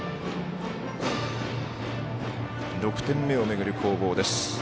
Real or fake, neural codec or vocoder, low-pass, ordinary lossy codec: real; none; none; none